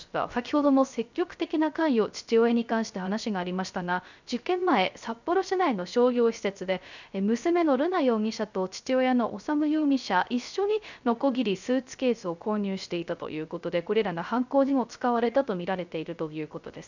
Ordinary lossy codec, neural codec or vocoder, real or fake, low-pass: none; codec, 16 kHz, 0.3 kbps, FocalCodec; fake; 7.2 kHz